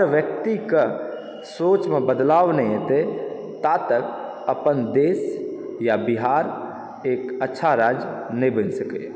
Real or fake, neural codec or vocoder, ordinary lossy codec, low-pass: real; none; none; none